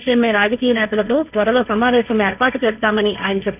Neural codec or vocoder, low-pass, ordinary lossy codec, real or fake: codec, 16 kHz, 1.1 kbps, Voila-Tokenizer; 3.6 kHz; none; fake